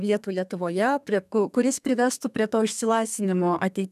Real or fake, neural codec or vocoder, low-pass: fake; codec, 32 kHz, 1.9 kbps, SNAC; 14.4 kHz